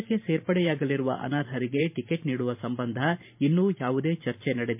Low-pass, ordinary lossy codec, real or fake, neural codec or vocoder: 3.6 kHz; MP3, 32 kbps; fake; vocoder, 44.1 kHz, 128 mel bands every 512 samples, BigVGAN v2